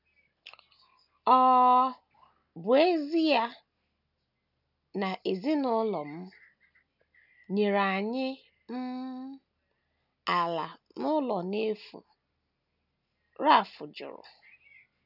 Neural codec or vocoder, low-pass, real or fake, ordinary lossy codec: none; 5.4 kHz; real; none